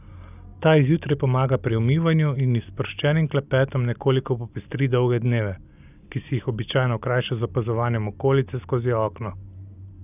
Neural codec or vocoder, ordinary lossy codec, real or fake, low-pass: none; none; real; 3.6 kHz